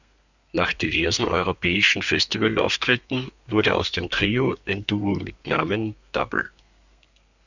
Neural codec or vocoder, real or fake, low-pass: codec, 32 kHz, 1.9 kbps, SNAC; fake; 7.2 kHz